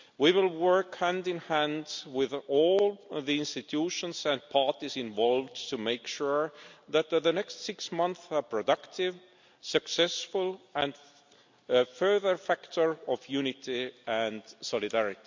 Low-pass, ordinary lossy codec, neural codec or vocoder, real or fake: 7.2 kHz; MP3, 64 kbps; none; real